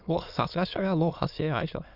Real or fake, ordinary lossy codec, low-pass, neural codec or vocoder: fake; none; 5.4 kHz; autoencoder, 22.05 kHz, a latent of 192 numbers a frame, VITS, trained on many speakers